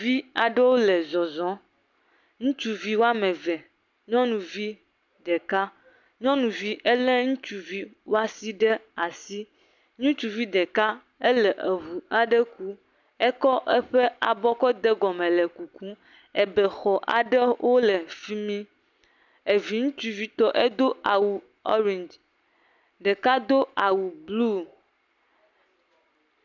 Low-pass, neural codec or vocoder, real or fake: 7.2 kHz; none; real